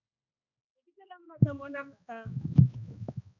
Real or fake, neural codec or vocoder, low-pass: fake; codec, 16 kHz, 2 kbps, X-Codec, HuBERT features, trained on balanced general audio; 7.2 kHz